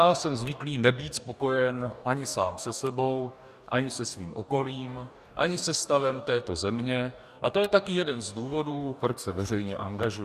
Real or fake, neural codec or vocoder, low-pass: fake; codec, 44.1 kHz, 2.6 kbps, DAC; 14.4 kHz